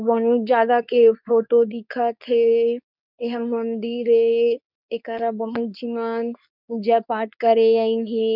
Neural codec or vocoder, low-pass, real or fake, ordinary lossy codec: codec, 24 kHz, 0.9 kbps, WavTokenizer, medium speech release version 2; 5.4 kHz; fake; none